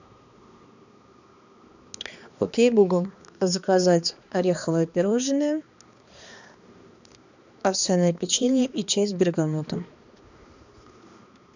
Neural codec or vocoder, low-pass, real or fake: codec, 16 kHz, 2 kbps, X-Codec, HuBERT features, trained on balanced general audio; 7.2 kHz; fake